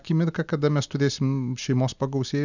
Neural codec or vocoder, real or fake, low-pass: none; real; 7.2 kHz